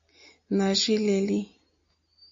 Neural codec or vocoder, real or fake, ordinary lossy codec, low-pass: none; real; AAC, 48 kbps; 7.2 kHz